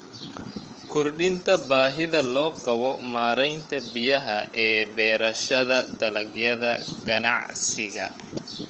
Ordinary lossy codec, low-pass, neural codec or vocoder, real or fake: Opus, 24 kbps; 7.2 kHz; codec, 16 kHz, 4 kbps, FreqCodec, larger model; fake